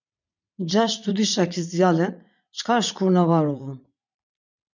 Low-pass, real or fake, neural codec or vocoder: 7.2 kHz; fake; vocoder, 44.1 kHz, 80 mel bands, Vocos